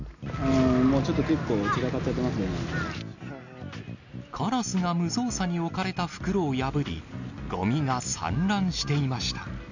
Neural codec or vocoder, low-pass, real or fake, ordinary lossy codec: none; 7.2 kHz; real; none